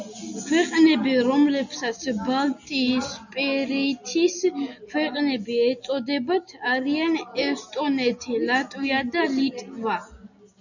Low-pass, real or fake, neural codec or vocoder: 7.2 kHz; real; none